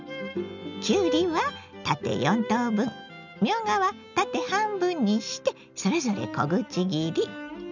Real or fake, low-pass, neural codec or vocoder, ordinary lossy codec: real; 7.2 kHz; none; none